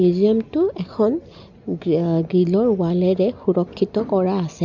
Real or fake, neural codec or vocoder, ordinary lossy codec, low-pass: real; none; none; 7.2 kHz